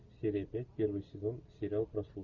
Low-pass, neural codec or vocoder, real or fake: 7.2 kHz; none; real